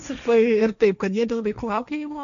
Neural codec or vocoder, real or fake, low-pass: codec, 16 kHz, 1.1 kbps, Voila-Tokenizer; fake; 7.2 kHz